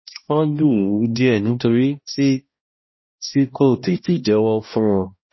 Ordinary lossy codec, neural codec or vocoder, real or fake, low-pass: MP3, 24 kbps; codec, 16 kHz, 1 kbps, X-Codec, HuBERT features, trained on balanced general audio; fake; 7.2 kHz